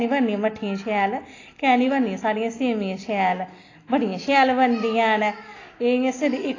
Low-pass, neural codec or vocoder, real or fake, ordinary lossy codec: 7.2 kHz; none; real; AAC, 32 kbps